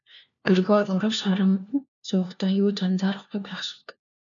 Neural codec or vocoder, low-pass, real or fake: codec, 16 kHz, 1 kbps, FunCodec, trained on LibriTTS, 50 frames a second; 7.2 kHz; fake